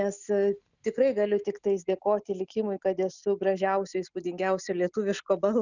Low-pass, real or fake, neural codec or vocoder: 7.2 kHz; real; none